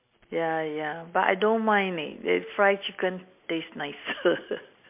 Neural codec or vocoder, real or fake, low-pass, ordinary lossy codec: none; real; 3.6 kHz; MP3, 32 kbps